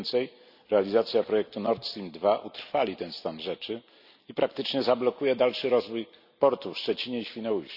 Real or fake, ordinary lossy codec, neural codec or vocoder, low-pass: real; none; none; 5.4 kHz